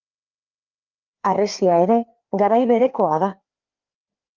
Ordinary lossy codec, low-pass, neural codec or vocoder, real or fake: Opus, 32 kbps; 7.2 kHz; codec, 16 kHz, 2 kbps, FreqCodec, larger model; fake